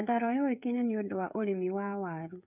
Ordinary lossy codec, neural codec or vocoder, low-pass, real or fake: none; codec, 16 kHz, 8 kbps, FreqCodec, smaller model; 3.6 kHz; fake